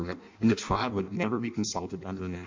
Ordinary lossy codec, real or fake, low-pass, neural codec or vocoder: MP3, 64 kbps; fake; 7.2 kHz; codec, 16 kHz in and 24 kHz out, 0.6 kbps, FireRedTTS-2 codec